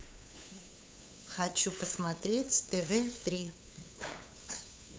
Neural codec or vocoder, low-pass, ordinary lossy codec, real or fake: codec, 16 kHz, 8 kbps, FunCodec, trained on LibriTTS, 25 frames a second; none; none; fake